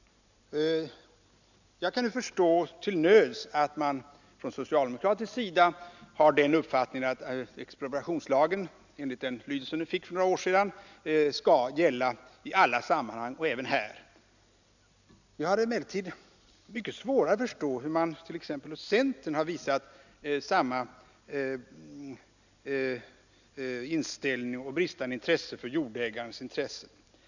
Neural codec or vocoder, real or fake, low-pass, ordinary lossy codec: none; real; 7.2 kHz; none